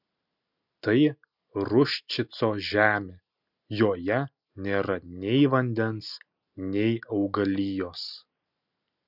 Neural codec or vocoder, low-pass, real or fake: none; 5.4 kHz; real